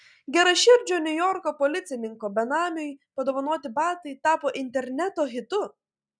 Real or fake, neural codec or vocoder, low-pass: real; none; 9.9 kHz